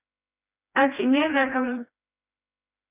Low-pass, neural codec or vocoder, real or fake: 3.6 kHz; codec, 16 kHz, 1 kbps, FreqCodec, smaller model; fake